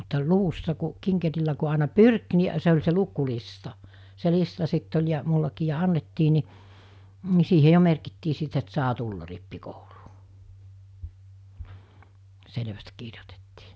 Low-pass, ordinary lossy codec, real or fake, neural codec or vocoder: none; none; real; none